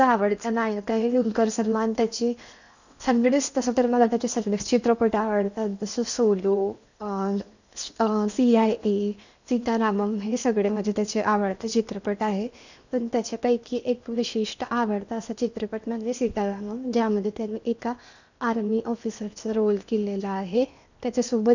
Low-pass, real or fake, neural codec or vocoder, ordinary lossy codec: 7.2 kHz; fake; codec, 16 kHz in and 24 kHz out, 0.8 kbps, FocalCodec, streaming, 65536 codes; none